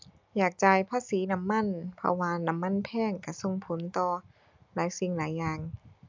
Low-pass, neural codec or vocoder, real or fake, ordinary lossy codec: 7.2 kHz; none; real; MP3, 64 kbps